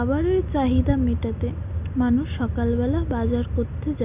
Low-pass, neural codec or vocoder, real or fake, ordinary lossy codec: 3.6 kHz; none; real; Opus, 64 kbps